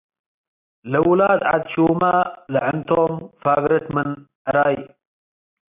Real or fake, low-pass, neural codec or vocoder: real; 3.6 kHz; none